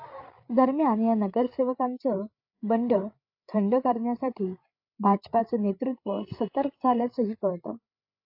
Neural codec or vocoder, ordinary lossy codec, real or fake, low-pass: codec, 16 kHz, 8 kbps, FreqCodec, larger model; AAC, 48 kbps; fake; 5.4 kHz